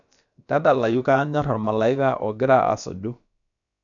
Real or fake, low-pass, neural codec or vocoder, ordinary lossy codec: fake; 7.2 kHz; codec, 16 kHz, about 1 kbps, DyCAST, with the encoder's durations; none